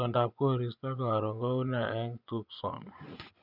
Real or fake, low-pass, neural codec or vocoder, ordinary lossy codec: fake; 5.4 kHz; vocoder, 24 kHz, 100 mel bands, Vocos; none